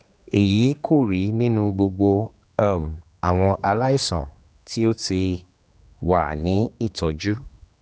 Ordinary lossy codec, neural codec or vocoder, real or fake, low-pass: none; codec, 16 kHz, 2 kbps, X-Codec, HuBERT features, trained on general audio; fake; none